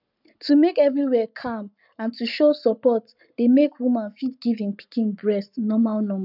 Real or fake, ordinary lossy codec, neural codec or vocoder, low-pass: fake; none; codec, 44.1 kHz, 7.8 kbps, Pupu-Codec; 5.4 kHz